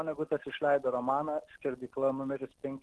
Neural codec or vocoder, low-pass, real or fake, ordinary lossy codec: none; 10.8 kHz; real; Opus, 16 kbps